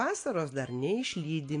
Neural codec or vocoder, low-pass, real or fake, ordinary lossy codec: none; 9.9 kHz; real; AAC, 48 kbps